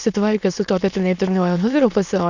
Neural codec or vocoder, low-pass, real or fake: autoencoder, 22.05 kHz, a latent of 192 numbers a frame, VITS, trained on many speakers; 7.2 kHz; fake